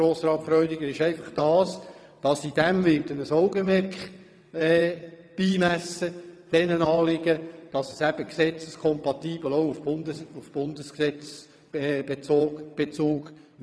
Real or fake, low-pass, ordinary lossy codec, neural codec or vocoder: fake; none; none; vocoder, 22.05 kHz, 80 mel bands, WaveNeXt